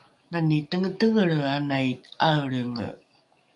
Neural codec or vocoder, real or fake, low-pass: codec, 44.1 kHz, 7.8 kbps, DAC; fake; 10.8 kHz